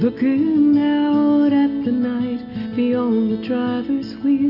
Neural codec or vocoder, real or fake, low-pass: none; real; 5.4 kHz